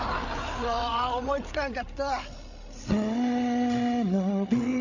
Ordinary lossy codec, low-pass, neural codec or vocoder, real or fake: none; 7.2 kHz; codec, 16 kHz, 8 kbps, FreqCodec, larger model; fake